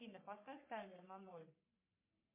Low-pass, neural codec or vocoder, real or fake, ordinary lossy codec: 3.6 kHz; codec, 44.1 kHz, 3.4 kbps, Pupu-Codec; fake; MP3, 24 kbps